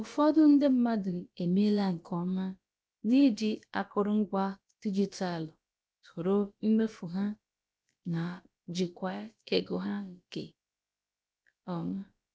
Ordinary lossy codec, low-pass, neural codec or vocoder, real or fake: none; none; codec, 16 kHz, about 1 kbps, DyCAST, with the encoder's durations; fake